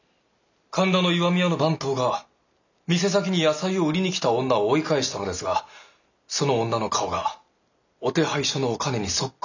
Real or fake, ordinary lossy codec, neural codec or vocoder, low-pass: real; none; none; 7.2 kHz